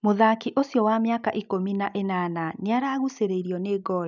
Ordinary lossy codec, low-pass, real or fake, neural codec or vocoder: none; 7.2 kHz; real; none